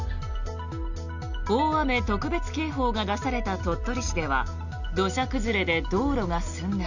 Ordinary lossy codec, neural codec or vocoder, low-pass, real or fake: none; none; 7.2 kHz; real